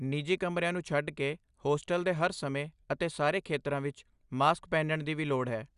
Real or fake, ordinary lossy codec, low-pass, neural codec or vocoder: real; Opus, 64 kbps; 10.8 kHz; none